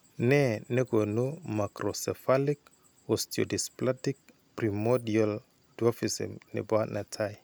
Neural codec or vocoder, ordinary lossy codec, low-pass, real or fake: none; none; none; real